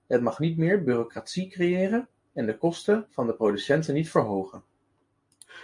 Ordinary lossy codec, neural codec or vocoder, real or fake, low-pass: AAC, 64 kbps; none; real; 10.8 kHz